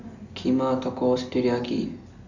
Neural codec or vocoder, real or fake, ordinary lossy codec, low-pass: none; real; none; 7.2 kHz